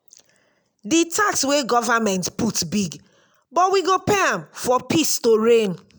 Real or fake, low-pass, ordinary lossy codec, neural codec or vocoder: real; none; none; none